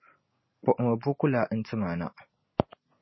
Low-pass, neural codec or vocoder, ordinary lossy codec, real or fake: 7.2 kHz; none; MP3, 24 kbps; real